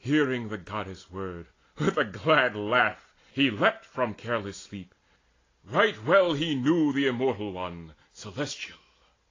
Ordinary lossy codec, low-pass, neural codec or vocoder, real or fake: AAC, 32 kbps; 7.2 kHz; none; real